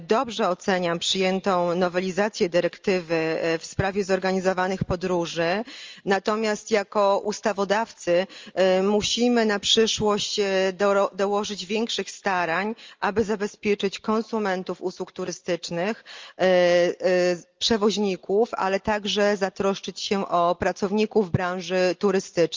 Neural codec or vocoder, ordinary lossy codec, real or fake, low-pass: none; Opus, 32 kbps; real; 7.2 kHz